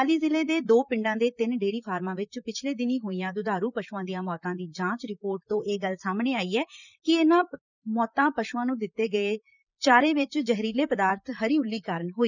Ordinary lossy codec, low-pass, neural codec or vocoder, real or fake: none; 7.2 kHz; vocoder, 44.1 kHz, 128 mel bands, Pupu-Vocoder; fake